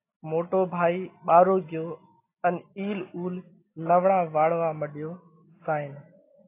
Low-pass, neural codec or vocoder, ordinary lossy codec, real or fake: 3.6 kHz; none; AAC, 24 kbps; real